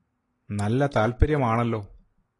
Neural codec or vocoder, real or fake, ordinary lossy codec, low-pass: none; real; AAC, 32 kbps; 10.8 kHz